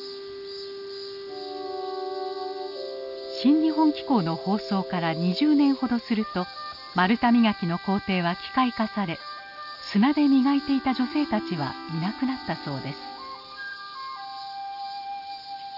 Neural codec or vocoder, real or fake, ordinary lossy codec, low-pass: none; real; none; 5.4 kHz